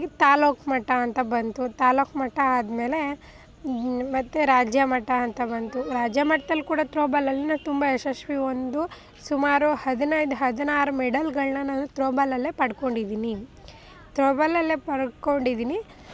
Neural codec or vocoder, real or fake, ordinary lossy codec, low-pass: none; real; none; none